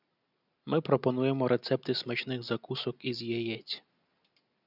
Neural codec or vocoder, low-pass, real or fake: none; 5.4 kHz; real